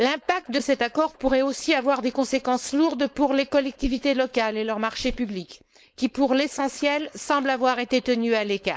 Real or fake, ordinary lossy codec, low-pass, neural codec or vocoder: fake; none; none; codec, 16 kHz, 4.8 kbps, FACodec